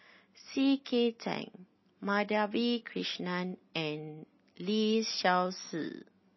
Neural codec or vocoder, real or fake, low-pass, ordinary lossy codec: none; real; 7.2 kHz; MP3, 24 kbps